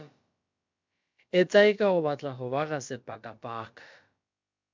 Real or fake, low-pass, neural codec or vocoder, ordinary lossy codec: fake; 7.2 kHz; codec, 16 kHz, about 1 kbps, DyCAST, with the encoder's durations; MP3, 64 kbps